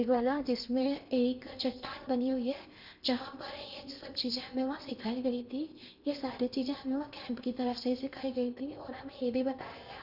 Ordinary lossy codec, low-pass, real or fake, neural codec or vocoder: none; 5.4 kHz; fake; codec, 16 kHz in and 24 kHz out, 0.8 kbps, FocalCodec, streaming, 65536 codes